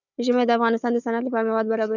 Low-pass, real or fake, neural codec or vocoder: 7.2 kHz; fake; codec, 16 kHz, 4 kbps, FunCodec, trained on Chinese and English, 50 frames a second